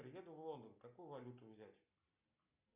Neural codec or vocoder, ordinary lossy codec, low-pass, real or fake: none; Opus, 64 kbps; 3.6 kHz; real